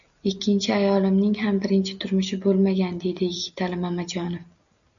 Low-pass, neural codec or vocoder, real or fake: 7.2 kHz; none; real